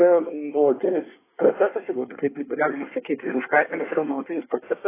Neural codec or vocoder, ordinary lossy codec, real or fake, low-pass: codec, 24 kHz, 1 kbps, SNAC; AAC, 16 kbps; fake; 3.6 kHz